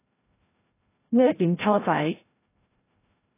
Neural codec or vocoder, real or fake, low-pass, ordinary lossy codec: codec, 16 kHz, 0.5 kbps, FreqCodec, larger model; fake; 3.6 kHz; AAC, 24 kbps